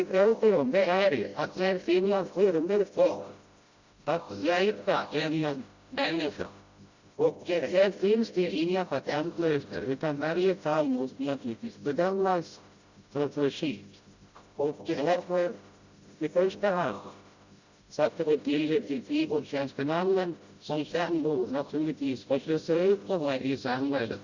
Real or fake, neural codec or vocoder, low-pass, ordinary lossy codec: fake; codec, 16 kHz, 0.5 kbps, FreqCodec, smaller model; 7.2 kHz; Opus, 64 kbps